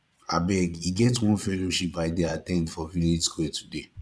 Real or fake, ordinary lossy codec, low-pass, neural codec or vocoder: fake; none; none; vocoder, 22.05 kHz, 80 mel bands, Vocos